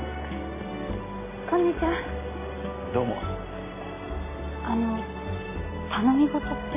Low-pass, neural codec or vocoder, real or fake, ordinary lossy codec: 3.6 kHz; none; real; MP3, 16 kbps